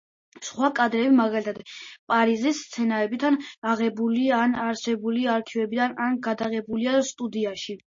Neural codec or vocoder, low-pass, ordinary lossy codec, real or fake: none; 7.2 kHz; MP3, 32 kbps; real